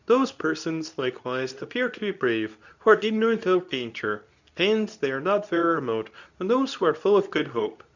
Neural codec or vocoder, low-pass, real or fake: codec, 24 kHz, 0.9 kbps, WavTokenizer, medium speech release version 2; 7.2 kHz; fake